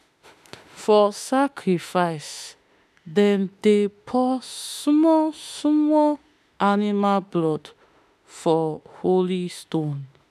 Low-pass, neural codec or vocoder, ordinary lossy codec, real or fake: 14.4 kHz; autoencoder, 48 kHz, 32 numbers a frame, DAC-VAE, trained on Japanese speech; none; fake